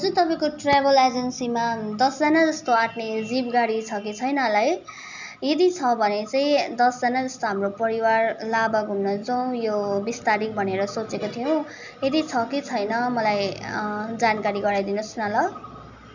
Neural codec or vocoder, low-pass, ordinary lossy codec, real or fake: none; 7.2 kHz; none; real